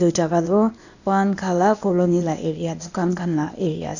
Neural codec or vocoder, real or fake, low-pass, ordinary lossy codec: codec, 16 kHz, 0.8 kbps, ZipCodec; fake; 7.2 kHz; none